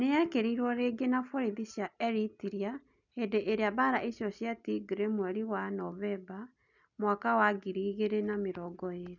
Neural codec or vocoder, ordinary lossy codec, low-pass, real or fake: none; none; 7.2 kHz; real